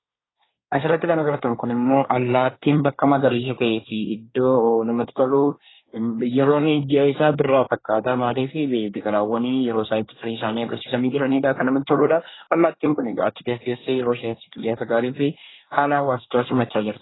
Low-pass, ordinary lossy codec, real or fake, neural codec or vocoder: 7.2 kHz; AAC, 16 kbps; fake; codec, 24 kHz, 1 kbps, SNAC